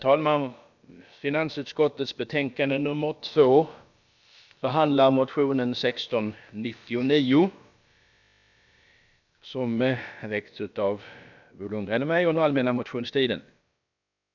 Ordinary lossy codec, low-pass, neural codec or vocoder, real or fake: none; 7.2 kHz; codec, 16 kHz, about 1 kbps, DyCAST, with the encoder's durations; fake